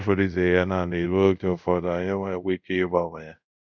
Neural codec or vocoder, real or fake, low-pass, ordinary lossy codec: codec, 24 kHz, 0.5 kbps, DualCodec; fake; 7.2 kHz; none